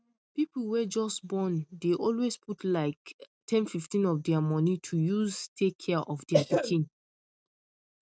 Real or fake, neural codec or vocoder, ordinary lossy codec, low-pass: real; none; none; none